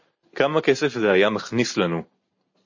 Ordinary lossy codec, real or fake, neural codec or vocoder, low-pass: MP3, 32 kbps; real; none; 7.2 kHz